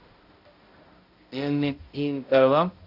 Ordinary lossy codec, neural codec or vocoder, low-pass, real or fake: AAC, 48 kbps; codec, 16 kHz, 0.5 kbps, X-Codec, HuBERT features, trained on balanced general audio; 5.4 kHz; fake